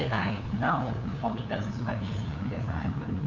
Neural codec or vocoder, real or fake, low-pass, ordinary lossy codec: codec, 16 kHz, 2 kbps, FunCodec, trained on LibriTTS, 25 frames a second; fake; 7.2 kHz; AAC, 48 kbps